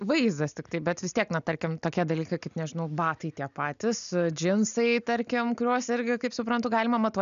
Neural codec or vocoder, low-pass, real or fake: none; 7.2 kHz; real